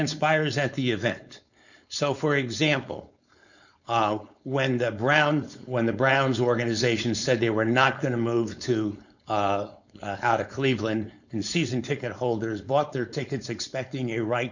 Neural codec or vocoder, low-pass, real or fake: codec, 16 kHz, 4.8 kbps, FACodec; 7.2 kHz; fake